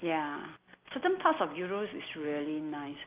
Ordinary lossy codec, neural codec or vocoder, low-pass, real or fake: Opus, 32 kbps; none; 3.6 kHz; real